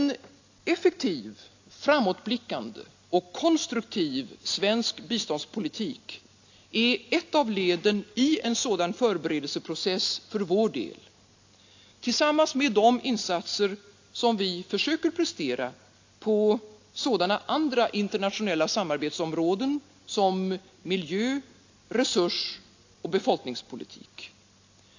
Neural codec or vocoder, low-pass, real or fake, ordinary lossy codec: none; 7.2 kHz; real; AAC, 48 kbps